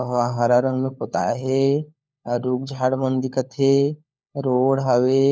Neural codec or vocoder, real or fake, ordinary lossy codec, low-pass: codec, 16 kHz, 4 kbps, FunCodec, trained on LibriTTS, 50 frames a second; fake; none; none